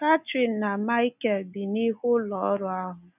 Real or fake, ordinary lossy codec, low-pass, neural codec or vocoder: real; none; 3.6 kHz; none